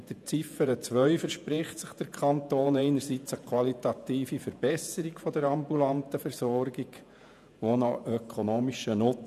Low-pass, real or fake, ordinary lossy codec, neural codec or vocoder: 14.4 kHz; fake; none; vocoder, 48 kHz, 128 mel bands, Vocos